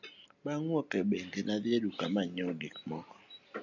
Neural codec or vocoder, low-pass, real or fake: none; 7.2 kHz; real